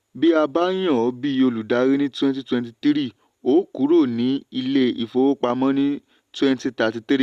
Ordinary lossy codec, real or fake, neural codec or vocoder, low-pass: Opus, 64 kbps; real; none; 14.4 kHz